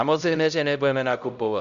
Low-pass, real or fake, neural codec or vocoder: 7.2 kHz; fake; codec, 16 kHz, 0.5 kbps, X-Codec, HuBERT features, trained on LibriSpeech